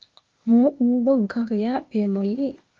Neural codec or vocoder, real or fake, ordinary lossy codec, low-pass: codec, 16 kHz, 0.8 kbps, ZipCodec; fake; Opus, 32 kbps; 7.2 kHz